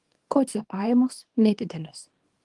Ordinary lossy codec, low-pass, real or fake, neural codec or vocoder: Opus, 24 kbps; 10.8 kHz; fake; codec, 24 kHz, 0.9 kbps, WavTokenizer, small release